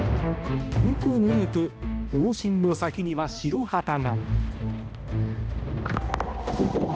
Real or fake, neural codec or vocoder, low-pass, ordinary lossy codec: fake; codec, 16 kHz, 1 kbps, X-Codec, HuBERT features, trained on balanced general audio; none; none